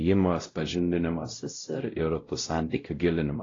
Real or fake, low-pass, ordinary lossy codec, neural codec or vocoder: fake; 7.2 kHz; AAC, 32 kbps; codec, 16 kHz, 0.5 kbps, X-Codec, WavLM features, trained on Multilingual LibriSpeech